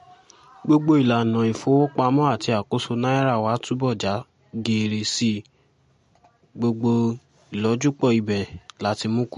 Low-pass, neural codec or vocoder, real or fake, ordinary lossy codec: 10.8 kHz; none; real; MP3, 48 kbps